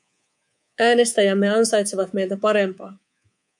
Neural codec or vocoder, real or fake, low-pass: codec, 24 kHz, 3.1 kbps, DualCodec; fake; 10.8 kHz